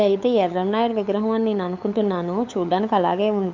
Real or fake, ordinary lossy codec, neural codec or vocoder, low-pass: fake; MP3, 48 kbps; codec, 16 kHz, 8 kbps, FunCodec, trained on LibriTTS, 25 frames a second; 7.2 kHz